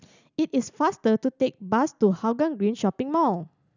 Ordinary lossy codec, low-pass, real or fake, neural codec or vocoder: none; 7.2 kHz; real; none